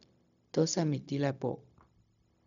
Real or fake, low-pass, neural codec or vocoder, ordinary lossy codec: fake; 7.2 kHz; codec, 16 kHz, 0.4 kbps, LongCat-Audio-Codec; none